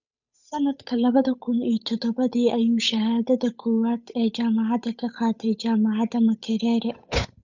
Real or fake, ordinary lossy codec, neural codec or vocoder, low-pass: fake; none; codec, 16 kHz, 8 kbps, FunCodec, trained on Chinese and English, 25 frames a second; 7.2 kHz